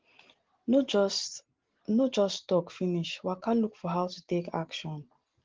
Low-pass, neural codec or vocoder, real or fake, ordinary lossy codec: 7.2 kHz; none; real; Opus, 16 kbps